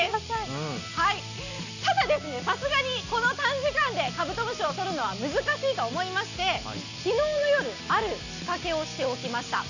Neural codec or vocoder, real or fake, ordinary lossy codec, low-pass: none; real; none; 7.2 kHz